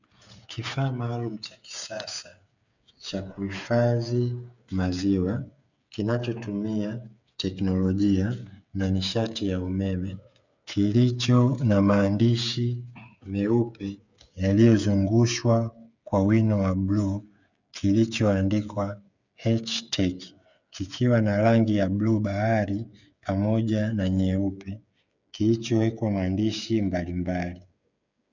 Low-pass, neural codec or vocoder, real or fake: 7.2 kHz; codec, 16 kHz, 8 kbps, FreqCodec, smaller model; fake